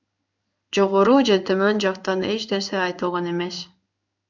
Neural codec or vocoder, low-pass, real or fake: codec, 16 kHz in and 24 kHz out, 1 kbps, XY-Tokenizer; 7.2 kHz; fake